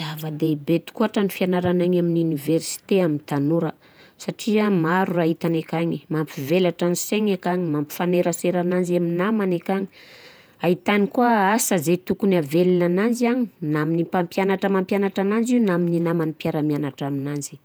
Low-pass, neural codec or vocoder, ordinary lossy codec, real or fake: none; vocoder, 48 kHz, 128 mel bands, Vocos; none; fake